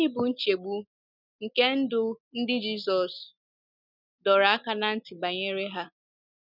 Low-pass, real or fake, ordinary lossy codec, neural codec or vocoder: 5.4 kHz; real; none; none